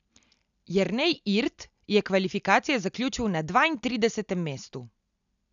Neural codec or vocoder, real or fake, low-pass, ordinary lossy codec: none; real; 7.2 kHz; none